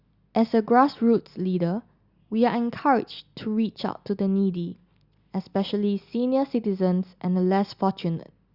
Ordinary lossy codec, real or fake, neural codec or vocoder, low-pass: Opus, 64 kbps; real; none; 5.4 kHz